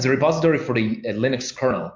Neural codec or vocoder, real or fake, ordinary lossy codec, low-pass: none; real; MP3, 48 kbps; 7.2 kHz